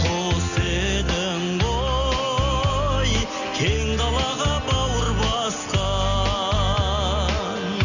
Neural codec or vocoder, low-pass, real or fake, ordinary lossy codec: none; 7.2 kHz; real; none